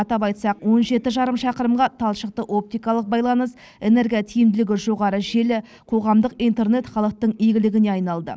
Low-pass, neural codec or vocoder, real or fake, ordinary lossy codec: none; none; real; none